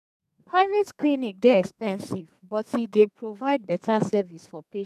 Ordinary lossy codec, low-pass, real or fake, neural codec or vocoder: none; 14.4 kHz; fake; codec, 32 kHz, 1.9 kbps, SNAC